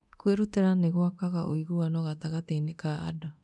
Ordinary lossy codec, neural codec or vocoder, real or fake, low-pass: none; codec, 24 kHz, 0.9 kbps, DualCodec; fake; 10.8 kHz